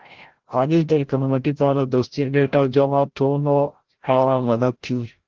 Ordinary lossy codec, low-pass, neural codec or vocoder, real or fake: Opus, 16 kbps; 7.2 kHz; codec, 16 kHz, 0.5 kbps, FreqCodec, larger model; fake